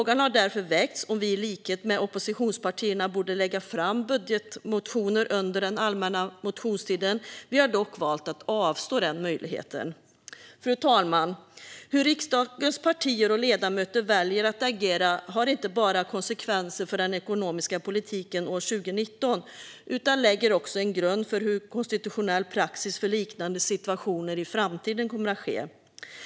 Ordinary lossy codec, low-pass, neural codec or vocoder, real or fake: none; none; none; real